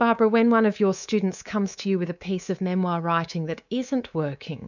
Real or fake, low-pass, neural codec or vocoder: fake; 7.2 kHz; codec, 24 kHz, 3.1 kbps, DualCodec